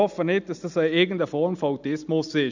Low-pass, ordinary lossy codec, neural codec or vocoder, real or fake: 7.2 kHz; none; none; real